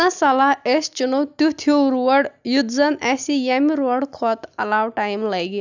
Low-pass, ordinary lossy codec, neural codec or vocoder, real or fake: 7.2 kHz; none; none; real